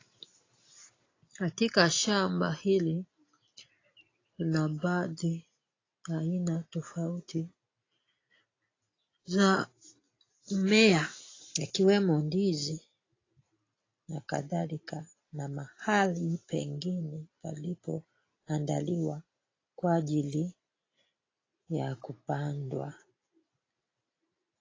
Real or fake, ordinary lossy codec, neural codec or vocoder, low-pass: real; AAC, 32 kbps; none; 7.2 kHz